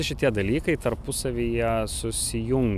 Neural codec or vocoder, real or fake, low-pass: none; real; 14.4 kHz